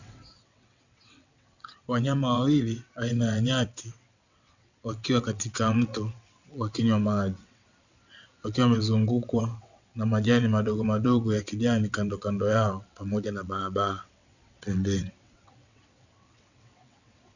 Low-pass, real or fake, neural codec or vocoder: 7.2 kHz; fake; vocoder, 22.05 kHz, 80 mel bands, WaveNeXt